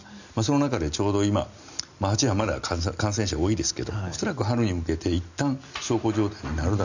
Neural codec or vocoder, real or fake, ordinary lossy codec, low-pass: none; real; none; 7.2 kHz